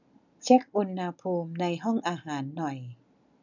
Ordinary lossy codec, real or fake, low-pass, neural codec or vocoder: none; real; 7.2 kHz; none